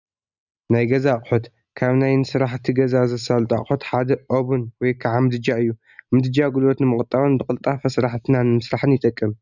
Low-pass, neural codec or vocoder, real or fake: 7.2 kHz; none; real